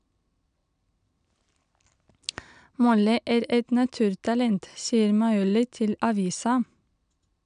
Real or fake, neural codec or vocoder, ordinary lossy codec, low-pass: real; none; none; 9.9 kHz